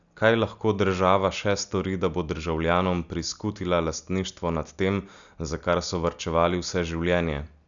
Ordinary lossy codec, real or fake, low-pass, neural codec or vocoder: none; real; 7.2 kHz; none